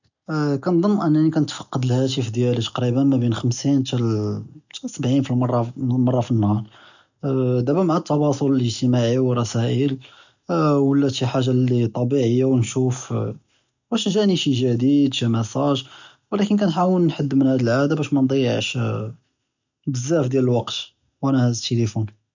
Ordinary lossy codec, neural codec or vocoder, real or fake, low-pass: none; none; real; 7.2 kHz